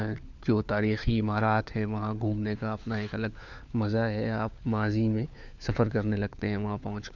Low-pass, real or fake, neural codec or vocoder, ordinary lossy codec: 7.2 kHz; fake; codec, 16 kHz, 4 kbps, FunCodec, trained on LibriTTS, 50 frames a second; none